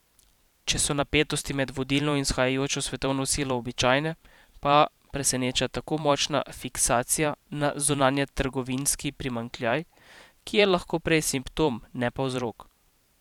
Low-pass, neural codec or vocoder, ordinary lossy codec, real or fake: 19.8 kHz; vocoder, 44.1 kHz, 128 mel bands every 512 samples, BigVGAN v2; none; fake